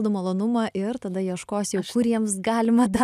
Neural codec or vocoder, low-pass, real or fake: none; 14.4 kHz; real